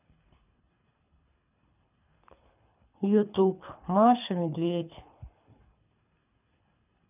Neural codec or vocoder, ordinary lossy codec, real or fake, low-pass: codec, 24 kHz, 3 kbps, HILCodec; none; fake; 3.6 kHz